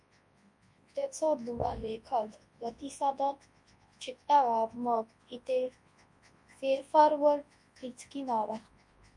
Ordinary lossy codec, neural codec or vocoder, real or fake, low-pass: MP3, 48 kbps; codec, 24 kHz, 0.9 kbps, WavTokenizer, large speech release; fake; 10.8 kHz